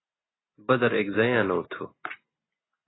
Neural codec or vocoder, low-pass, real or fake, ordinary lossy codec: none; 7.2 kHz; real; AAC, 16 kbps